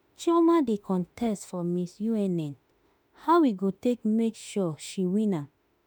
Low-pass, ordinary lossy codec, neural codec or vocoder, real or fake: none; none; autoencoder, 48 kHz, 32 numbers a frame, DAC-VAE, trained on Japanese speech; fake